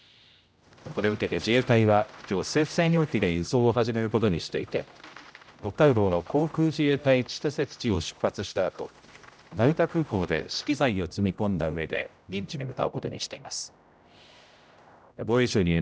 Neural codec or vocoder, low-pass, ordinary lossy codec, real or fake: codec, 16 kHz, 0.5 kbps, X-Codec, HuBERT features, trained on general audio; none; none; fake